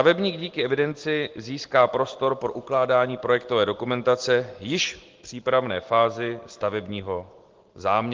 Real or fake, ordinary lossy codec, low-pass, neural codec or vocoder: real; Opus, 16 kbps; 7.2 kHz; none